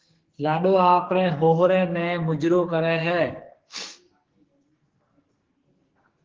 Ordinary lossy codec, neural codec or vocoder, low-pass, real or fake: Opus, 16 kbps; codec, 44.1 kHz, 2.6 kbps, SNAC; 7.2 kHz; fake